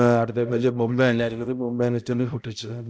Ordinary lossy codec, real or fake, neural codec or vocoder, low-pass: none; fake; codec, 16 kHz, 0.5 kbps, X-Codec, HuBERT features, trained on balanced general audio; none